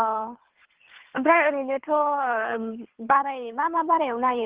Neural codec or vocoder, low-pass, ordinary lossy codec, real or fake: codec, 24 kHz, 3 kbps, HILCodec; 3.6 kHz; Opus, 16 kbps; fake